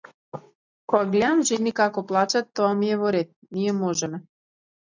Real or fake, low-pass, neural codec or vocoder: real; 7.2 kHz; none